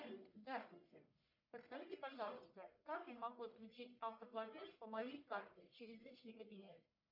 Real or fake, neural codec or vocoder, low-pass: fake; codec, 44.1 kHz, 1.7 kbps, Pupu-Codec; 5.4 kHz